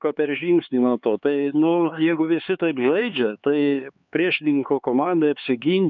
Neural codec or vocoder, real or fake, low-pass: codec, 16 kHz, 4 kbps, X-Codec, HuBERT features, trained on LibriSpeech; fake; 7.2 kHz